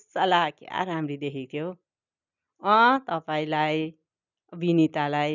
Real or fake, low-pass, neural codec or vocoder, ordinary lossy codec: real; 7.2 kHz; none; none